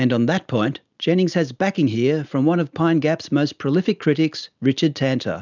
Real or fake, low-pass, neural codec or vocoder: real; 7.2 kHz; none